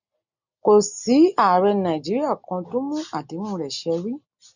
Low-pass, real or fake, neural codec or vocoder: 7.2 kHz; real; none